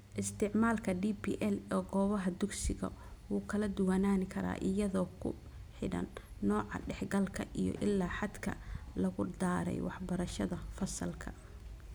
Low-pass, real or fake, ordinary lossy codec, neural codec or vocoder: none; real; none; none